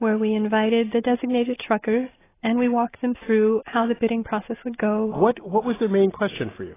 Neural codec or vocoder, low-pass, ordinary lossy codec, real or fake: none; 3.6 kHz; AAC, 16 kbps; real